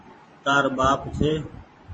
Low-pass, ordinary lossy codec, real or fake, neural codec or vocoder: 10.8 kHz; MP3, 32 kbps; fake; vocoder, 44.1 kHz, 128 mel bands every 512 samples, BigVGAN v2